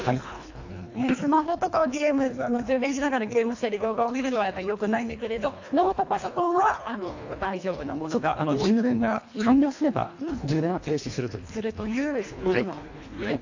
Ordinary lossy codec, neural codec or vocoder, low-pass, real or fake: AAC, 48 kbps; codec, 24 kHz, 1.5 kbps, HILCodec; 7.2 kHz; fake